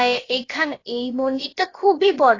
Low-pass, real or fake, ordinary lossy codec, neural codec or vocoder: 7.2 kHz; fake; AAC, 32 kbps; codec, 16 kHz, about 1 kbps, DyCAST, with the encoder's durations